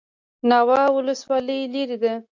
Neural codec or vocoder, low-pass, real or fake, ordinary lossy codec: none; 7.2 kHz; real; AAC, 48 kbps